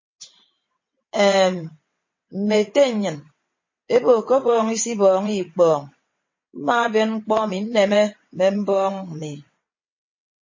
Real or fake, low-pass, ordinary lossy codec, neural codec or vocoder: fake; 7.2 kHz; MP3, 32 kbps; vocoder, 22.05 kHz, 80 mel bands, WaveNeXt